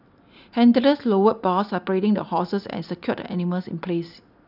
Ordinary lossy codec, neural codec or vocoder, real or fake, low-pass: none; none; real; 5.4 kHz